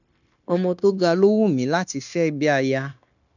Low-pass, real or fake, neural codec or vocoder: 7.2 kHz; fake; codec, 16 kHz, 0.9 kbps, LongCat-Audio-Codec